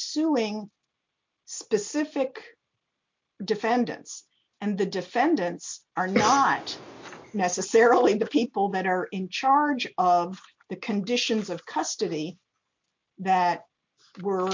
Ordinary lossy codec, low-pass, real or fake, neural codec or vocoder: MP3, 48 kbps; 7.2 kHz; real; none